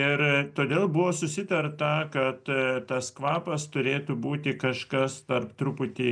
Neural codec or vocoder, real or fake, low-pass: none; real; 9.9 kHz